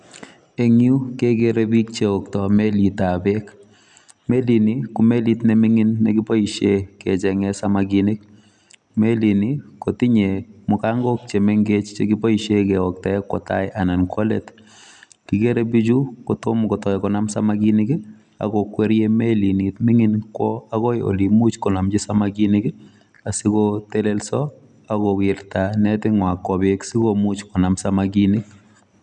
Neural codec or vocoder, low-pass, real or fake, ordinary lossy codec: none; 10.8 kHz; real; none